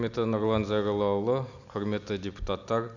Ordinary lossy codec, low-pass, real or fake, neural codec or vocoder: none; 7.2 kHz; real; none